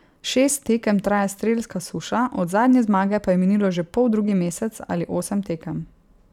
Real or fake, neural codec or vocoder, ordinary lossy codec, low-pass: fake; vocoder, 44.1 kHz, 128 mel bands every 512 samples, BigVGAN v2; none; 19.8 kHz